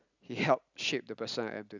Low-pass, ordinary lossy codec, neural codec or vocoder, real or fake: 7.2 kHz; none; none; real